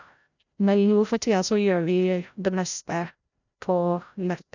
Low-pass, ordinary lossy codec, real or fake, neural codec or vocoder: 7.2 kHz; none; fake; codec, 16 kHz, 0.5 kbps, FreqCodec, larger model